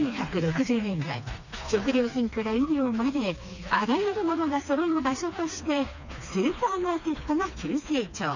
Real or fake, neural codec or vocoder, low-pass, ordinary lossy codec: fake; codec, 16 kHz, 2 kbps, FreqCodec, smaller model; 7.2 kHz; none